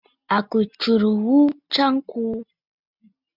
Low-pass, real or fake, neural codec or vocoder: 5.4 kHz; real; none